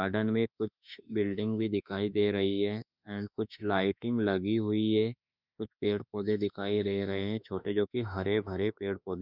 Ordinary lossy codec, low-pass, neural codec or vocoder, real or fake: none; 5.4 kHz; autoencoder, 48 kHz, 32 numbers a frame, DAC-VAE, trained on Japanese speech; fake